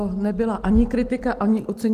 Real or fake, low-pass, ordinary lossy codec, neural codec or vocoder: real; 14.4 kHz; Opus, 24 kbps; none